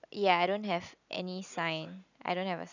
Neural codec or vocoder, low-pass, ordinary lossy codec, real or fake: none; 7.2 kHz; none; real